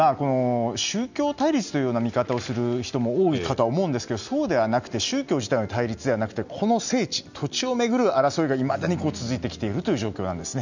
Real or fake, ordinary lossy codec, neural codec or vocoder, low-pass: real; none; none; 7.2 kHz